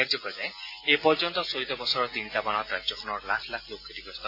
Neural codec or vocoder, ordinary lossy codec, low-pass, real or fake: none; AAC, 32 kbps; 5.4 kHz; real